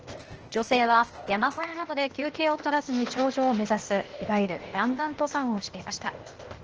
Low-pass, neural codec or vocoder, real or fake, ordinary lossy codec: 7.2 kHz; codec, 16 kHz, 0.8 kbps, ZipCodec; fake; Opus, 16 kbps